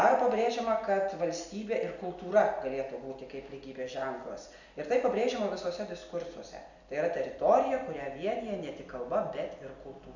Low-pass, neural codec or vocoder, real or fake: 7.2 kHz; none; real